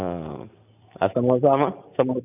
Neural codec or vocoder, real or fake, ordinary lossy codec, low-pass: none; real; none; 3.6 kHz